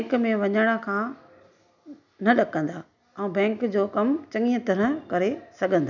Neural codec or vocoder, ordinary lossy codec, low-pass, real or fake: none; none; 7.2 kHz; real